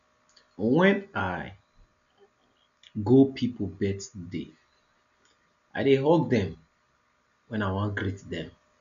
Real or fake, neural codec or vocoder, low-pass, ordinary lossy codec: real; none; 7.2 kHz; none